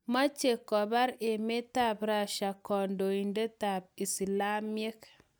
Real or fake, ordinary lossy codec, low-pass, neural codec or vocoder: real; none; none; none